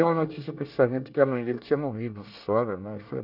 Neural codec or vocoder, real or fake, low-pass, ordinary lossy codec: codec, 24 kHz, 1 kbps, SNAC; fake; 5.4 kHz; none